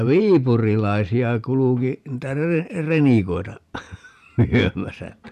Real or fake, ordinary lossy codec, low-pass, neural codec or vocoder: fake; none; 14.4 kHz; vocoder, 44.1 kHz, 128 mel bands every 512 samples, BigVGAN v2